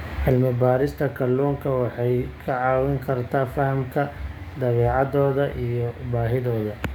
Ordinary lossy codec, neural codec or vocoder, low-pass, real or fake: none; codec, 44.1 kHz, 7.8 kbps, DAC; 19.8 kHz; fake